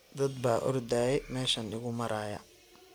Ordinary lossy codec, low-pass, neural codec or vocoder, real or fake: none; none; none; real